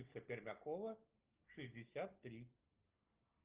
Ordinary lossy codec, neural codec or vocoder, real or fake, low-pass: Opus, 32 kbps; none; real; 3.6 kHz